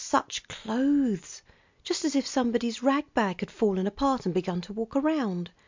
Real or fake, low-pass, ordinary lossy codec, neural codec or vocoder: real; 7.2 kHz; MP3, 64 kbps; none